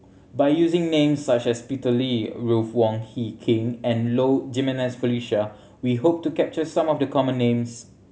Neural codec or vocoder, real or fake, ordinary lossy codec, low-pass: none; real; none; none